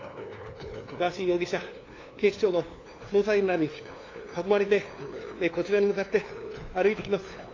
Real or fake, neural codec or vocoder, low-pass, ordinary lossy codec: fake; codec, 16 kHz, 2 kbps, FunCodec, trained on LibriTTS, 25 frames a second; 7.2 kHz; AAC, 32 kbps